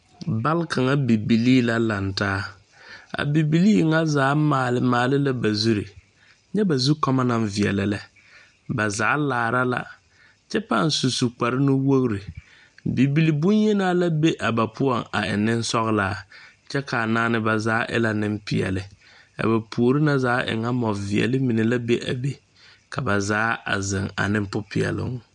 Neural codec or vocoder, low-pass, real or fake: none; 9.9 kHz; real